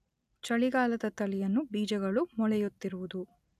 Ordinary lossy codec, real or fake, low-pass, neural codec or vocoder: none; real; 14.4 kHz; none